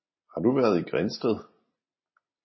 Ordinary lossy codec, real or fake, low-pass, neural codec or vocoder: MP3, 24 kbps; real; 7.2 kHz; none